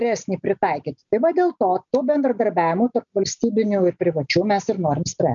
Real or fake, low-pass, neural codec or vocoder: real; 7.2 kHz; none